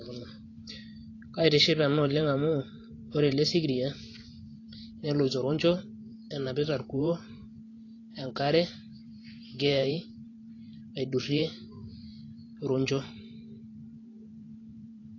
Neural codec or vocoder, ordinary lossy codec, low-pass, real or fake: vocoder, 44.1 kHz, 128 mel bands every 512 samples, BigVGAN v2; AAC, 32 kbps; 7.2 kHz; fake